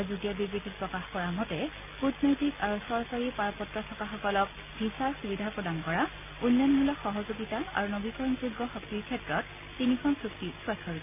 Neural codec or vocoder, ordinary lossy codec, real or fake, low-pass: none; none; real; 3.6 kHz